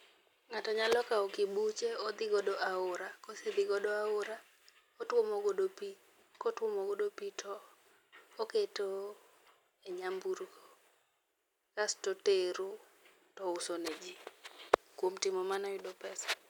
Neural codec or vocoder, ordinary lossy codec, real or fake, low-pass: none; none; real; 19.8 kHz